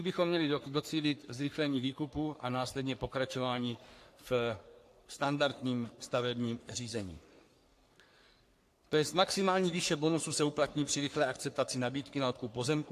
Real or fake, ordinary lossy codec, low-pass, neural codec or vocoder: fake; AAC, 64 kbps; 14.4 kHz; codec, 44.1 kHz, 3.4 kbps, Pupu-Codec